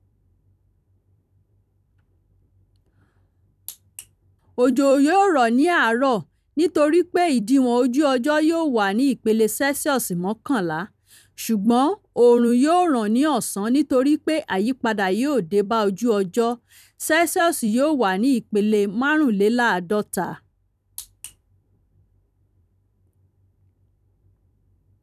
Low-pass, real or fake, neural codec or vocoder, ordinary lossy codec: 14.4 kHz; fake; vocoder, 44.1 kHz, 128 mel bands every 256 samples, BigVGAN v2; none